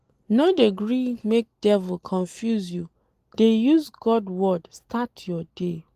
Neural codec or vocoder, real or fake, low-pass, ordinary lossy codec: none; real; 14.4 kHz; Opus, 24 kbps